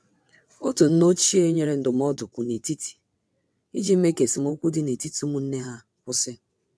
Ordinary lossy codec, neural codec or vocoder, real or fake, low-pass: none; vocoder, 22.05 kHz, 80 mel bands, WaveNeXt; fake; none